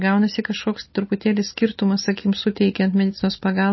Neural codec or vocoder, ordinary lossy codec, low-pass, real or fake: none; MP3, 24 kbps; 7.2 kHz; real